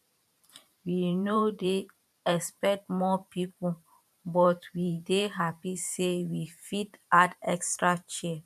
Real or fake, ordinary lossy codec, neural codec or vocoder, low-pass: fake; none; vocoder, 44.1 kHz, 128 mel bands every 512 samples, BigVGAN v2; 14.4 kHz